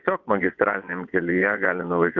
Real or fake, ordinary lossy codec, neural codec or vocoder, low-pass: fake; Opus, 24 kbps; vocoder, 22.05 kHz, 80 mel bands, WaveNeXt; 7.2 kHz